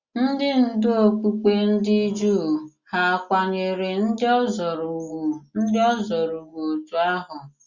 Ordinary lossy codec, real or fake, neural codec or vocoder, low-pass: Opus, 64 kbps; real; none; 7.2 kHz